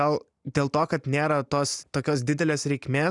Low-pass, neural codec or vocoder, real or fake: 10.8 kHz; none; real